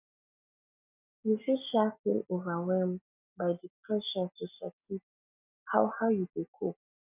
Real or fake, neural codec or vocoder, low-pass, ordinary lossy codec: real; none; 3.6 kHz; none